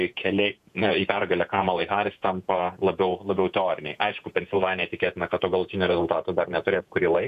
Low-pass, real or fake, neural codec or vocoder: 14.4 kHz; fake; vocoder, 48 kHz, 128 mel bands, Vocos